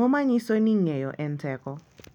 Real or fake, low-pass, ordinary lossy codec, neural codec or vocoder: real; 19.8 kHz; none; none